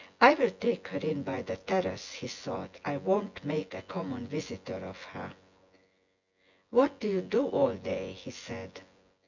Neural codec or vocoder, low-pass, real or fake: vocoder, 24 kHz, 100 mel bands, Vocos; 7.2 kHz; fake